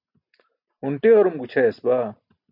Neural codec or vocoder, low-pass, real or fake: none; 5.4 kHz; real